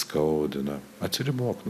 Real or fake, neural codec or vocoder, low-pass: real; none; 14.4 kHz